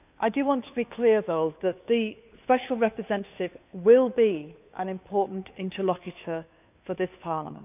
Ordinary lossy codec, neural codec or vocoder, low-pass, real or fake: none; codec, 16 kHz, 2 kbps, FunCodec, trained on Chinese and English, 25 frames a second; 3.6 kHz; fake